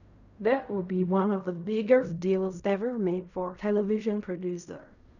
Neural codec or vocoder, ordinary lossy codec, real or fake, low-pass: codec, 16 kHz in and 24 kHz out, 0.4 kbps, LongCat-Audio-Codec, fine tuned four codebook decoder; none; fake; 7.2 kHz